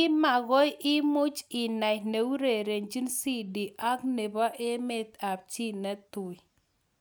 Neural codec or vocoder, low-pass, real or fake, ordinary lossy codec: none; none; real; none